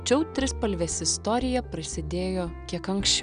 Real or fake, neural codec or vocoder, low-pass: real; none; 9.9 kHz